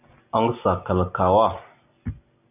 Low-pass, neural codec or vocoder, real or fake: 3.6 kHz; none; real